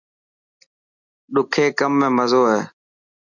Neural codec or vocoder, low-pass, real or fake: none; 7.2 kHz; real